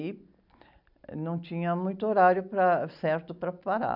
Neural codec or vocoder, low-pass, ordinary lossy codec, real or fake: none; 5.4 kHz; none; real